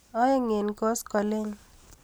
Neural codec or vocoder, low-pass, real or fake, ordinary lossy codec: none; none; real; none